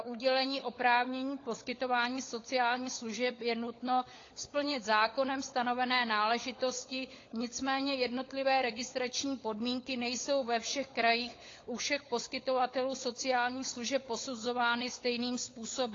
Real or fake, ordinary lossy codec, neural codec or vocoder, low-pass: fake; AAC, 32 kbps; codec, 16 kHz, 16 kbps, FunCodec, trained on LibriTTS, 50 frames a second; 7.2 kHz